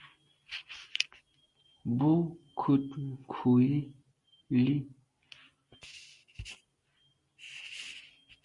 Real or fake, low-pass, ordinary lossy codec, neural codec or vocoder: real; 10.8 kHz; Opus, 64 kbps; none